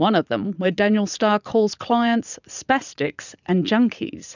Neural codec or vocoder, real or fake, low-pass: vocoder, 44.1 kHz, 80 mel bands, Vocos; fake; 7.2 kHz